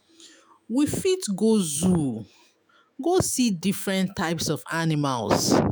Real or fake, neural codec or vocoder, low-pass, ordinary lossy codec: fake; autoencoder, 48 kHz, 128 numbers a frame, DAC-VAE, trained on Japanese speech; none; none